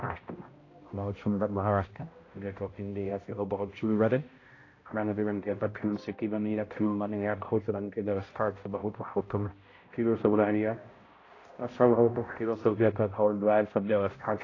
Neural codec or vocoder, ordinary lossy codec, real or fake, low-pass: codec, 16 kHz, 0.5 kbps, X-Codec, HuBERT features, trained on balanced general audio; AAC, 32 kbps; fake; 7.2 kHz